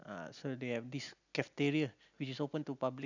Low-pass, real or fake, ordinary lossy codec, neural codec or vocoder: 7.2 kHz; real; none; none